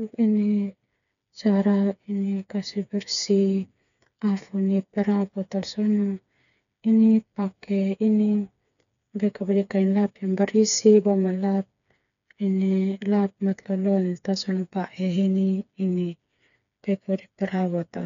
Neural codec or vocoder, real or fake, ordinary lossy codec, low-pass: codec, 16 kHz, 4 kbps, FreqCodec, smaller model; fake; none; 7.2 kHz